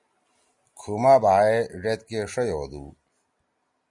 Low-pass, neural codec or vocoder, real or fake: 10.8 kHz; none; real